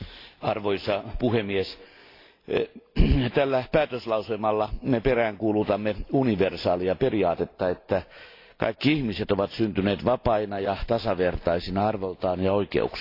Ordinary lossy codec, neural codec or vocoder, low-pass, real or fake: AAC, 32 kbps; none; 5.4 kHz; real